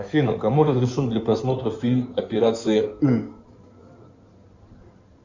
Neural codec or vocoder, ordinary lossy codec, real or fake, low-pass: codec, 16 kHz in and 24 kHz out, 2.2 kbps, FireRedTTS-2 codec; Opus, 64 kbps; fake; 7.2 kHz